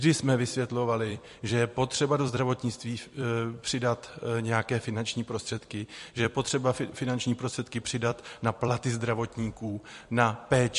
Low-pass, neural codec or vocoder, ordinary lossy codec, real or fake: 14.4 kHz; vocoder, 44.1 kHz, 128 mel bands every 256 samples, BigVGAN v2; MP3, 48 kbps; fake